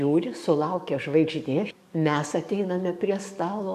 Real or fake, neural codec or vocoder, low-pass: fake; codec, 44.1 kHz, 7.8 kbps, DAC; 14.4 kHz